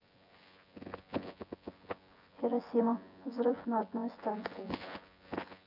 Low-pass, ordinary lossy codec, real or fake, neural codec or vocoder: 5.4 kHz; none; fake; vocoder, 24 kHz, 100 mel bands, Vocos